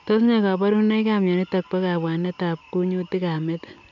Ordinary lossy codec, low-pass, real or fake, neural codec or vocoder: none; 7.2 kHz; real; none